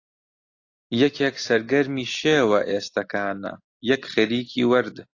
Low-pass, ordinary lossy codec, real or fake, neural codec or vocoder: 7.2 kHz; AAC, 48 kbps; real; none